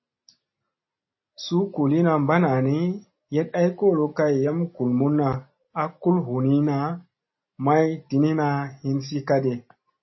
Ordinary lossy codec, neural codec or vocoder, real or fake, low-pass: MP3, 24 kbps; none; real; 7.2 kHz